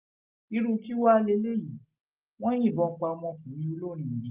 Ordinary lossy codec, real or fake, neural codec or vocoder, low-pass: Opus, 24 kbps; real; none; 3.6 kHz